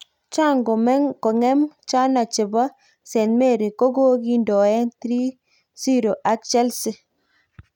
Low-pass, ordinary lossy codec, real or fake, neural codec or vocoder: 19.8 kHz; none; real; none